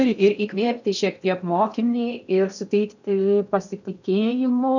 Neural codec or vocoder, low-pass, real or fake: codec, 16 kHz in and 24 kHz out, 0.6 kbps, FocalCodec, streaming, 4096 codes; 7.2 kHz; fake